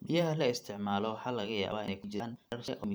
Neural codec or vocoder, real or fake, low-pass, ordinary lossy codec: vocoder, 44.1 kHz, 128 mel bands every 512 samples, BigVGAN v2; fake; none; none